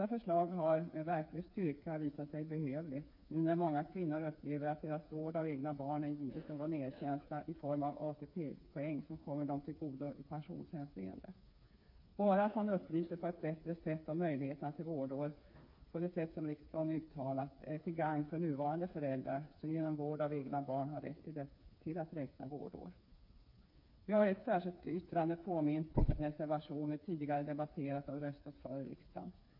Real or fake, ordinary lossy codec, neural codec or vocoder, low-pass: fake; MP3, 32 kbps; codec, 16 kHz, 4 kbps, FreqCodec, smaller model; 5.4 kHz